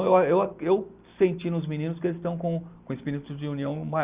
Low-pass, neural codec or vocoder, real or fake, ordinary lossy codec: 3.6 kHz; none; real; Opus, 64 kbps